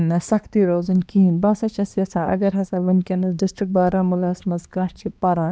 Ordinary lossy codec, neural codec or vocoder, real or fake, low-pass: none; codec, 16 kHz, 2 kbps, X-Codec, HuBERT features, trained on balanced general audio; fake; none